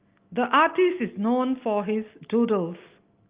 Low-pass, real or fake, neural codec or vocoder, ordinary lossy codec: 3.6 kHz; real; none; Opus, 32 kbps